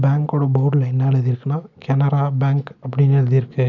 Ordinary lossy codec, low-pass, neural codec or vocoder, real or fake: none; 7.2 kHz; none; real